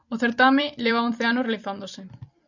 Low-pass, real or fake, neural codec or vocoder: 7.2 kHz; fake; vocoder, 44.1 kHz, 128 mel bands every 256 samples, BigVGAN v2